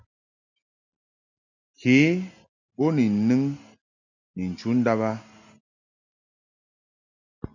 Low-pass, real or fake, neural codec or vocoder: 7.2 kHz; real; none